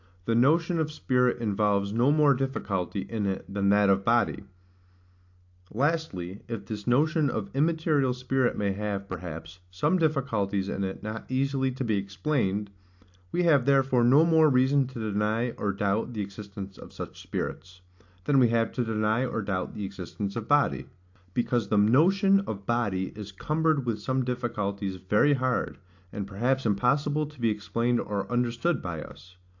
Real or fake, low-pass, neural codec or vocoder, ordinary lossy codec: real; 7.2 kHz; none; AAC, 48 kbps